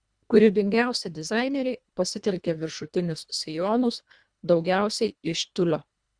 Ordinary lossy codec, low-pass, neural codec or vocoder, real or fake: Opus, 64 kbps; 9.9 kHz; codec, 24 kHz, 1.5 kbps, HILCodec; fake